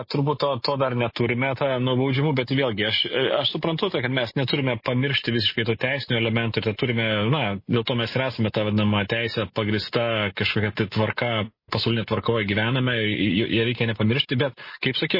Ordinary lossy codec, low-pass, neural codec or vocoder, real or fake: MP3, 24 kbps; 5.4 kHz; none; real